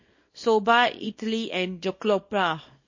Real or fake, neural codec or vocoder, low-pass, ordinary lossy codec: fake; codec, 24 kHz, 0.9 kbps, WavTokenizer, small release; 7.2 kHz; MP3, 32 kbps